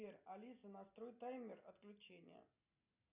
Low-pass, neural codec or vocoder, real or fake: 3.6 kHz; none; real